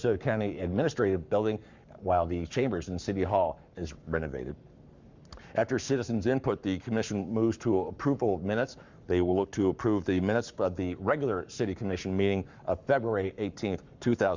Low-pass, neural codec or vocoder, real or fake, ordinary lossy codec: 7.2 kHz; codec, 44.1 kHz, 7.8 kbps, Pupu-Codec; fake; Opus, 64 kbps